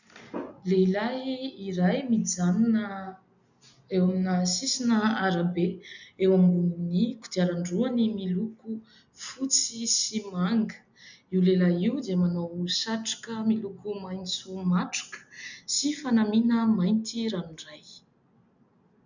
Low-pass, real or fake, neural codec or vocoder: 7.2 kHz; real; none